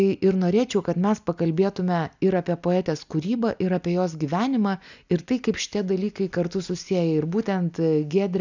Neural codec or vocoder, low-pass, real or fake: none; 7.2 kHz; real